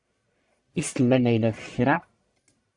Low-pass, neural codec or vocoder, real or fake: 10.8 kHz; codec, 44.1 kHz, 1.7 kbps, Pupu-Codec; fake